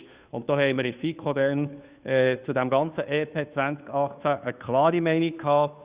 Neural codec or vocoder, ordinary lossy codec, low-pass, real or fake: codec, 16 kHz, 2 kbps, FunCodec, trained on Chinese and English, 25 frames a second; Opus, 64 kbps; 3.6 kHz; fake